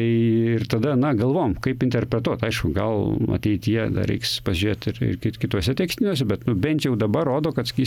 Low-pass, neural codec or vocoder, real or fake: 19.8 kHz; none; real